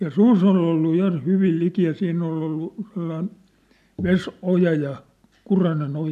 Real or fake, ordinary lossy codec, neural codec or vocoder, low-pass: real; none; none; 14.4 kHz